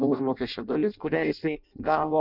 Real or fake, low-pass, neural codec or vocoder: fake; 5.4 kHz; codec, 16 kHz in and 24 kHz out, 0.6 kbps, FireRedTTS-2 codec